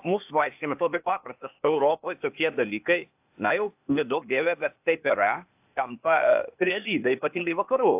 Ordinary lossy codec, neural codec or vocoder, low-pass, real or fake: AAC, 32 kbps; codec, 16 kHz, 0.8 kbps, ZipCodec; 3.6 kHz; fake